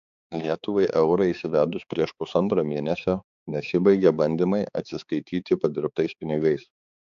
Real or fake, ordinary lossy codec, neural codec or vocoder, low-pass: fake; AAC, 96 kbps; codec, 16 kHz, 4 kbps, X-Codec, HuBERT features, trained on balanced general audio; 7.2 kHz